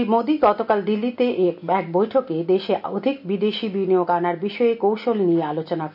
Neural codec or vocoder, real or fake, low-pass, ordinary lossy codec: none; real; 5.4 kHz; none